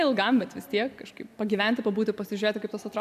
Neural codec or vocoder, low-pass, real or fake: none; 14.4 kHz; real